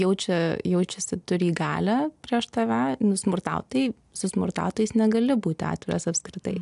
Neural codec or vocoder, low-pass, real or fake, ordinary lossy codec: none; 10.8 kHz; real; AAC, 96 kbps